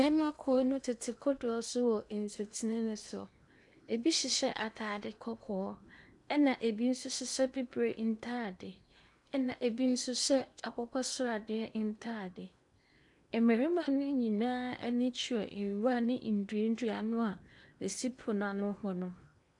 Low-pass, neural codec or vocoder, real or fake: 10.8 kHz; codec, 16 kHz in and 24 kHz out, 0.8 kbps, FocalCodec, streaming, 65536 codes; fake